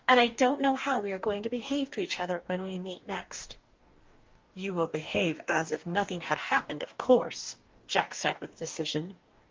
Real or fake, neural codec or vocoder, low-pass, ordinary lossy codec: fake; codec, 44.1 kHz, 2.6 kbps, DAC; 7.2 kHz; Opus, 32 kbps